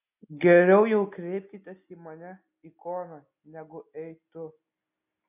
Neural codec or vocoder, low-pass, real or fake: none; 3.6 kHz; real